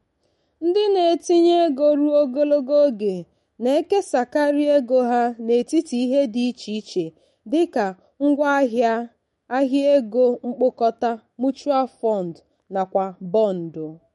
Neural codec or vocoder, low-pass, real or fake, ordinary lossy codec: autoencoder, 48 kHz, 128 numbers a frame, DAC-VAE, trained on Japanese speech; 19.8 kHz; fake; MP3, 48 kbps